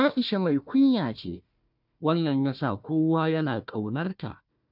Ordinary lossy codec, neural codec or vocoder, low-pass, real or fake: none; codec, 16 kHz, 1 kbps, FunCodec, trained on Chinese and English, 50 frames a second; 5.4 kHz; fake